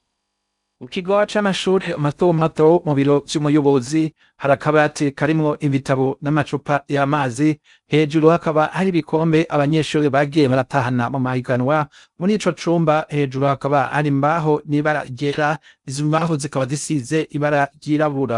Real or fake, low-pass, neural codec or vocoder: fake; 10.8 kHz; codec, 16 kHz in and 24 kHz out, 0.6 kbps, FocalCodec, streaming, 4096 codes